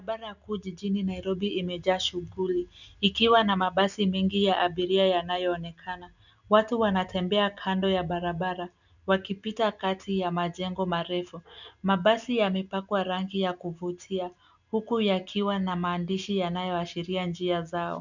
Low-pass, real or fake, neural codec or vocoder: 7.2 kHz; real; none